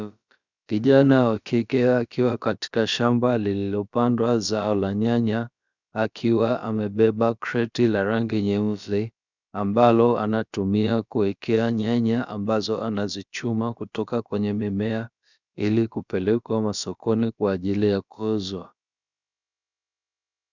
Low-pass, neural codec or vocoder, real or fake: 7.2 kHz; codec, 16 kHz, about 1 kbps, DyCAST, with the encoder's durations; fake